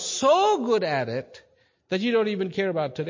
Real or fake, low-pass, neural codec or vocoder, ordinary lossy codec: real; 7.2 kHz; none; MP3, 32 kbps